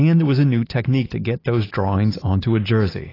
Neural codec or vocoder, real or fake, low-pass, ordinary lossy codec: none; real; 5.4 kHz; AAC, 24 kbps